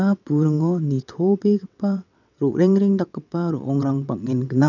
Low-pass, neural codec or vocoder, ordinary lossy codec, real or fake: 7.2 kHz; vocoder, 22.05 kHz, 80 mel bands, WaveNeXt; none; fake